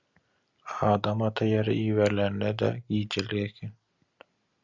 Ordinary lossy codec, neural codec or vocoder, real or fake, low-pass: Opus, 64 kbps; none; real; 7.2 kHz